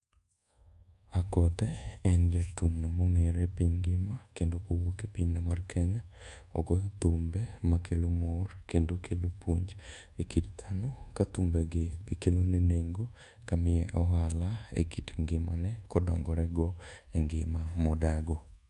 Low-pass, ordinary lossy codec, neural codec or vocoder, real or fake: 10.8 kHz; none; codec, 24 kHz, 1.2 kbps, DualCodec; fake